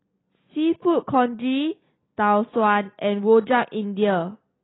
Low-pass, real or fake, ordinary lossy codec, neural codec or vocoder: 7.2 kHz; real; AAC, 16 kbps; none